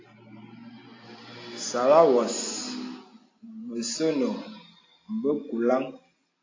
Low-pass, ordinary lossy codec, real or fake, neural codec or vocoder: 7.2 kHz; AAC, 32 kbps; real; none